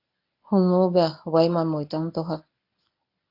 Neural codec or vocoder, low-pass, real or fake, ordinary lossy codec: codec, 24 kHz, 0.9 kbps, WavTokenizer, medium speech release version 1; 5.4 kHz; fake; AAC, 32 kbps